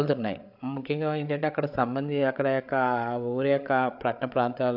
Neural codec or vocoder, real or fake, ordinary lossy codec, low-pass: codec, 16 kHz, 8 kbps, FreqCodec, larger model; fake; AAC, 48 kbps; 5.4 kHz